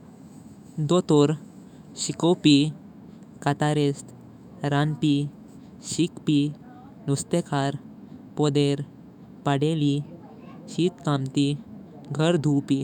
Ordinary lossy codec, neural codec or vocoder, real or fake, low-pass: none; autoencoder, 48 kHz, 128 numbers a frame, DAC-VAE, trained on Japanese speech; fake; 19.8 kHz